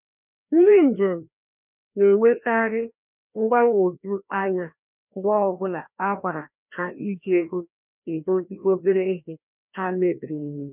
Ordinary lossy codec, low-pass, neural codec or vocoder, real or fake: none; 3.6 kHz; codec, 16 kHz, 1 kbps, FreqCodec, larger model; fake